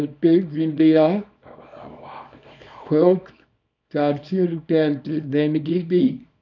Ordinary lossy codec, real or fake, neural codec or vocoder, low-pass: none; fake; codec, 24 kHz, 0.9 kbps, WavTokenizer, small release; 7.2 kHz